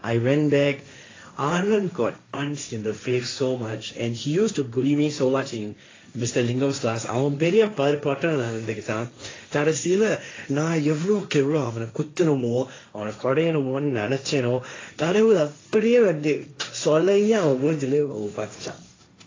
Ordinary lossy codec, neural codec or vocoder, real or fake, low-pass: AAC, 32 kbps; codec, 16 kHz, 1.1 kbps, Voila-Tokenizer; fake; 7.2 kHz